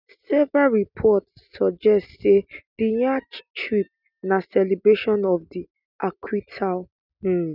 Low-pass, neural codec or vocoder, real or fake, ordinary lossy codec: 5.4 kHz; none; real; none